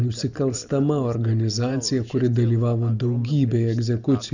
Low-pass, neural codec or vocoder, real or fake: 7.2 kHz; none; real